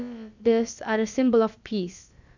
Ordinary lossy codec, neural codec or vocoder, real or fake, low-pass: none; codec, 16 kHz, about 1 kbps, DyCAST, with the encoder's durations; fake; 7.2 kHz